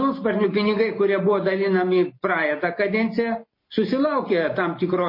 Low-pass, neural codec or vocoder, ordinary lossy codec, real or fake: 5.4 kHz; none; MP3, 24 kbps; real